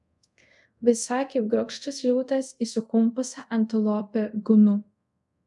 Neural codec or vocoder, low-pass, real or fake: codec, 24 kHz, 0.5 kbps, DualCodec; 10.8 kHz; fake